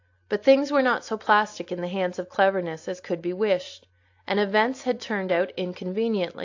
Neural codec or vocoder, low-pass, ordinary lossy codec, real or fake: none; 7.2 kHz; AAC, 48 kbps; real